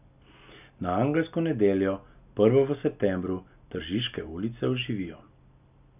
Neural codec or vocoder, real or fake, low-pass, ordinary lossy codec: none; real; 3.6 kHz; none